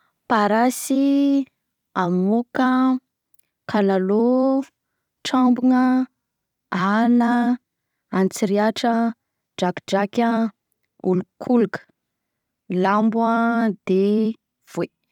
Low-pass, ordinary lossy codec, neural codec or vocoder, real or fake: 19.8 kHz; none; vocoder, 44.1 kHz, 128 mel bands every 256 samples, BigVGAN v2; fake